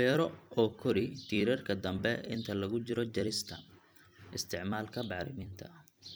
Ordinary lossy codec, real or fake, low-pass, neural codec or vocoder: none; fake; none; vocoder, 44.1 kHz, 128 mel bands every 256 samples, BigVGAN v2